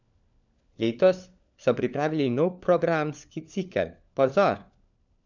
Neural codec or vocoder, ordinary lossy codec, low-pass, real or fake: codec, 16 kHz, 4 kbps, FunCodec, trained on LibriTTS, 50 frames a second; none; 7.2 kHz; fake